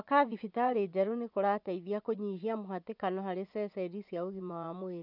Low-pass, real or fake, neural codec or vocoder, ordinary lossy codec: 5.4 kHz; fake; vocoder, 24 kHz, 100 mel bands, Vocos; none